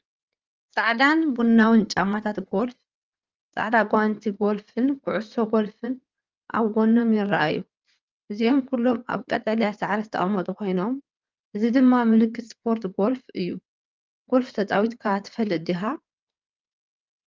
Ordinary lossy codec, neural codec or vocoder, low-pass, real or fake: Opus, 24 kbps; codec, 16 kHz in and 24 kHz out, 2.2 kbps, FireRedTTS-2 codec; 7.2 kHz; fake